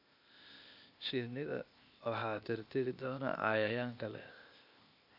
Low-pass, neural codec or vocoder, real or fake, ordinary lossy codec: 5.4 kHz; codec, 16 kHz, 0.8 kbps, ZipCodec; fake; none